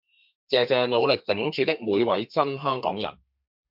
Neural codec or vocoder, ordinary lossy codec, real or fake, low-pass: codec, 32 kHz, 1.9 kbps, SNAC; MP3, 48 kbps; fake; 5.4 kHz